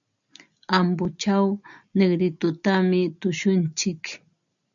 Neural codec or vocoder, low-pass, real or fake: none; 7.2 kHz; real